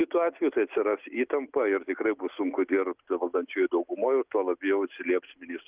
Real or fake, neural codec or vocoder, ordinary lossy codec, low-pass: real; none; Opus, 32 kbps; 3.6 kHz